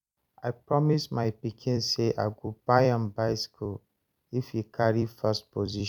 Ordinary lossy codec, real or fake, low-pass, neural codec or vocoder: none; fake; 19.8 kHz; vocoder, 44.1 kHz, 128 mel bands every 256 samples, BigVGAN v2